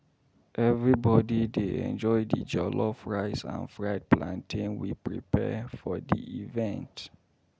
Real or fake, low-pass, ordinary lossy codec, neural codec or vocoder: real; none; none; none